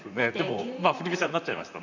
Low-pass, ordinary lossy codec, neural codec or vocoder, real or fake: 7.2 kHz; none; vocoder, 44.1 kHz, 128 mel bands, Pupu-Vocoder; fake